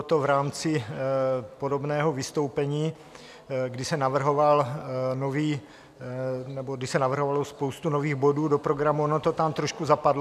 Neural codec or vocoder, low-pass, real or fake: none; 14.4 kHz; real